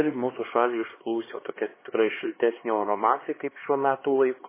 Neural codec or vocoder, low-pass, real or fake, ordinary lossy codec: codec, 16 kHz, 2 kbps, X-Codec, HuBERT features, trained on LibriSpeech; 3.6 kHz; fake; MP3, 16 kbps